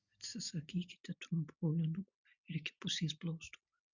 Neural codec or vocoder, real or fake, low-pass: none; real; 7.2 kHz